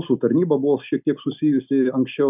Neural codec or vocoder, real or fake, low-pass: none; real; 3.6 kHz